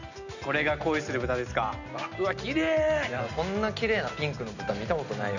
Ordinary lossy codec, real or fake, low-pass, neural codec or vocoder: none; real; 7.2 kHz; none